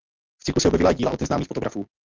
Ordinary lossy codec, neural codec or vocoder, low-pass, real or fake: Opus, 32 kbps; none; 7.2 kHz; real